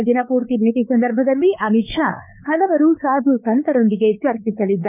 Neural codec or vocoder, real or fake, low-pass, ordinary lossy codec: codec, 16 kHz, 4 kbps, X-Codec, WavLM features, trained on Multilingual LibriSpeech; fake; 3.6 kHz; none